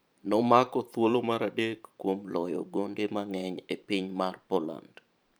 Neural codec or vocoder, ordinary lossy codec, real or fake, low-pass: vocoder, 44.1 kHz, 128 mel bands every 512 samples, BigVGAN v2; none; fake; none